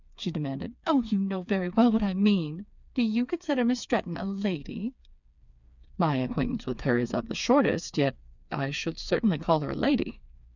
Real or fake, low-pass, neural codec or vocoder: fake; 7.2 kHz; codec, 16 kHz, 4 kbps, FreqCodec, smaller model